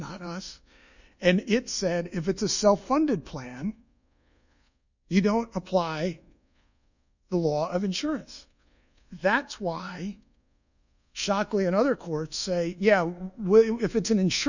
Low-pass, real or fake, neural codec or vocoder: 7.2 kHz; fake; codec, 24 kHz, 1.2 kbps, DualCodec